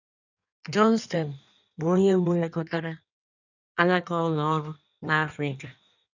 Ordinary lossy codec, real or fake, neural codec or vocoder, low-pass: none; fake; codec, 16 kHz in and 24 kHz out, 1.1 kbps, FireRedTTS-2 codec; 7.2 kHz